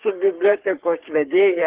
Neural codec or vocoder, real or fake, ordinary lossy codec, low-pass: codec, 44.1 kHz, 3.4 kbps, Pupu-Codec; fake; Opus, 16 kbps; 3.6 kHz